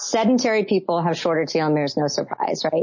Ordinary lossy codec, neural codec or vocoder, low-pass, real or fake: MP3, 32 kbps; none; 7.2 kHz; real